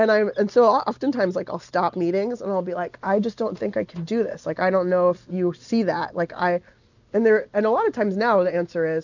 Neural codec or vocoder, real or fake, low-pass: none; real; 7.2 kHz